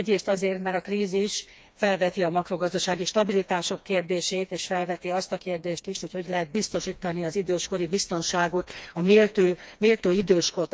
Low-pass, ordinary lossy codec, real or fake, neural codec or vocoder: none; none; fake; codec, 16 kHz, 2 kbps, FreqCodec, smaller model